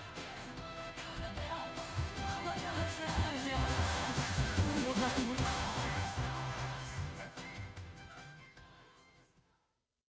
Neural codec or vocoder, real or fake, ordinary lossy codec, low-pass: codec, 16 kHz, 0.5 kbps, FunCodec, trained on Chinese and English, 25 frames a second; fake; none; none